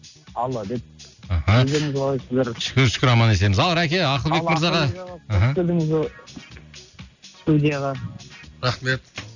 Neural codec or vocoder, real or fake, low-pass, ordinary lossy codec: none; real; 7.2 kHz; none